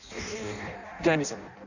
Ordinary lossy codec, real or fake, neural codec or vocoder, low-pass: none; fake; codec, 16 kHz in and 24 kHz out, 0.6 kbps, FireRedTTS-2 codec; 7.2 kHz